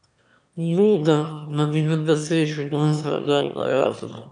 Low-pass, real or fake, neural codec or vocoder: 9.9 kHz; fake; autoencoder, 22.05 kHz, a latent of 192 numbers a frame, VITS, trained on one speaker